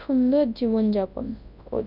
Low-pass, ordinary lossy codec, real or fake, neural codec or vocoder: 5.4 kHz; none; fake; codec, 24 kHz, 0.9 kbps, WavTokenizer, large speech release